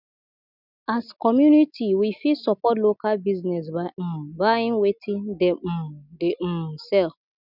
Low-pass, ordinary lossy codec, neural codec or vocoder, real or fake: 5.4 kHz; none; none; real